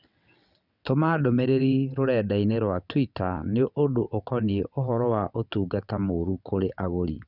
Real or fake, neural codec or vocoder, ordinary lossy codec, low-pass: fake; vocoder, 22.05 kHz, 80 mel bands, WaveNeXt; none; 5.4 kHz